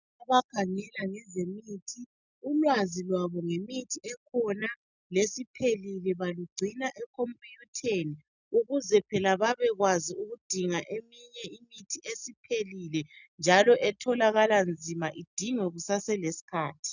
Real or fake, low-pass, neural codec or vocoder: real; 7.2 kHz; none